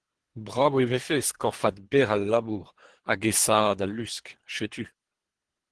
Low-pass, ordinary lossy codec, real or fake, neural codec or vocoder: 10.8 kHz; Opus, 16 kbps; fake; codec, 24 kHz, 3 kbps, HILCodec